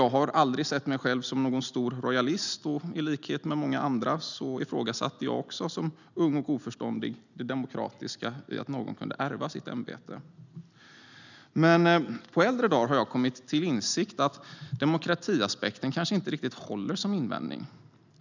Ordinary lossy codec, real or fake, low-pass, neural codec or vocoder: none; real; 7.2 kHz; none